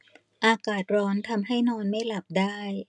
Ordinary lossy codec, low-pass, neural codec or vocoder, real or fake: none; 10.8 kHz; none; real